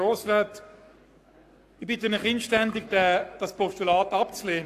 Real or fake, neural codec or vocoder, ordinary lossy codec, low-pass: fake; codec, 44.1 kHz, 7.8 kbps, Pupu-Codec; AAC, 64 kbps; 14.4 kHz